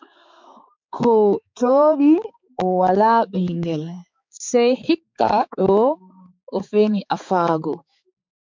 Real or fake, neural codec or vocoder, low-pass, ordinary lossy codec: fake; codec, 16 kHz, 4 kbps, X-Codec, HuBERT features, trained on balanced general audio; 7.2 kHz; MP3, 64 kbps